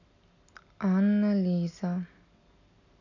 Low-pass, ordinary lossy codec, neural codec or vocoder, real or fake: 7.2 kHz; none; none; real